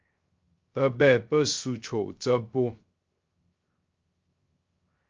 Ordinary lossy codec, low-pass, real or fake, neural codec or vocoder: Opus, 24 kbps; 7.2 kHz; fake; codec, 16 kHz, 0.3 kbps, FocalCodec